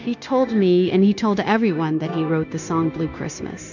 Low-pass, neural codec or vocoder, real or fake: 7.2 kHz; codec, 16 kHz, 0.9 kbps, LongCat-Audio-Codec; fake